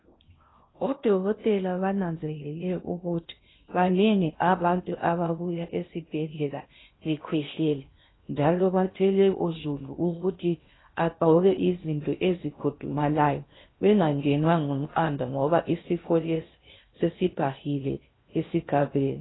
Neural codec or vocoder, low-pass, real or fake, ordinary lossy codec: codec, 16 kHz in and 24 kHz out, 0.6 kbps, FocalCodec, streaming, 2048 codes; 7.2 kHz; fake; AAC, 16 kbps